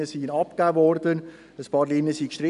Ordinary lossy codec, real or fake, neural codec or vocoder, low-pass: none; real; none; 10.8 kHz